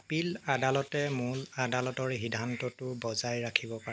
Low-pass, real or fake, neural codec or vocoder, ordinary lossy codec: none; real; none; none